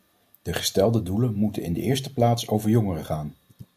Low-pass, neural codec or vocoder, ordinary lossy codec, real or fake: 14.4 kHz; none; AAC, 96 kbps; real